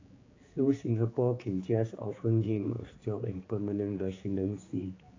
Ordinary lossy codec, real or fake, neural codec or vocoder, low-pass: AAC, 32 kbps; fake; codec, 16 kHz, 4 kbps, X-Codec, HuBERT features, trained on general audio; 7.2 kHz